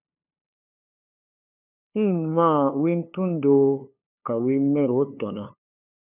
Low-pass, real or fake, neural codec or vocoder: 3.6 kHz; fake; codec, 16 kHz, 2 kbps, FunCodec, trained on LibriTTS, 25 frames a second